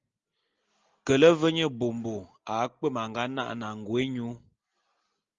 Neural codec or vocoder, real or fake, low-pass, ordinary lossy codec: none; real; 7.2 kHz; Opus, 32 kbps